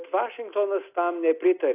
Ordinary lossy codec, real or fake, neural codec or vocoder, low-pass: AAC, 24 kbps; real; none; 3.6 kHz